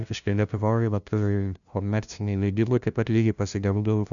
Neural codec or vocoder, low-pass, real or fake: codec, 16 kHz, 0.5 kbps, FunCodec, trained on LibriTTS, 25 frames a second; 7.2 kHz; fake